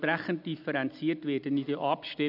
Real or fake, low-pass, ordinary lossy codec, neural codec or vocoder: real; 5.4 kHz; none; none